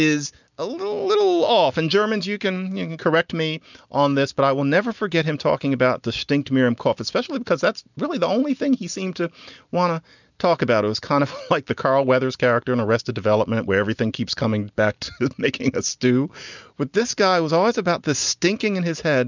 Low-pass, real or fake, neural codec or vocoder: 7.2 kHz; real; none